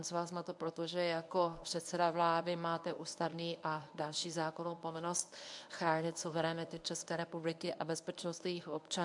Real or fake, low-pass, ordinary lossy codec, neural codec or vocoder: fake; 10.8 kHz; AAC, 64 kbps; codec, 24 kHz, 0.9 kbps, WavTokenizer, medium speech release version 1